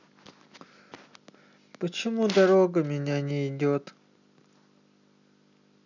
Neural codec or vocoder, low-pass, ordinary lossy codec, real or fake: none; 7.2 kHz; none; real